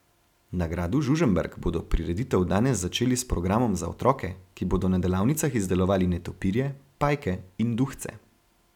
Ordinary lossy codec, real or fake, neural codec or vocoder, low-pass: none; real; none; 19.8 kHz